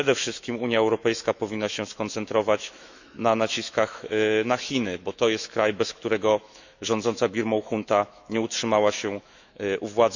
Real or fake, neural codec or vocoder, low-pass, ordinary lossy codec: fake; autoencoder, 48 kHz, 128 numbers a frame, DAC-VAE, trained on Japanese speech; 7.2 kHz; none